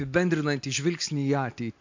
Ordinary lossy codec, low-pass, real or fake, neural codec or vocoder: MP3, 48 kbps; 7.2 kHz; real; none